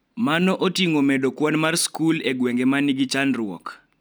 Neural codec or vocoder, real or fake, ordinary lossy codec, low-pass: none; real; none; none